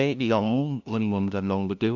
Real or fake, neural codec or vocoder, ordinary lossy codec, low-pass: fake; codec, 16 kHz, 0.5 kbps, FunCodec, trained on LibriTTS, 25 frames a second; none; 7.2 kHz